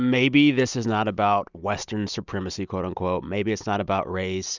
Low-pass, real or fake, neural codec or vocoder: 7.2 kHz; real; none